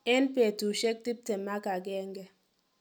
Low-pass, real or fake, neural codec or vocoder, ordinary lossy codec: none; real; none; none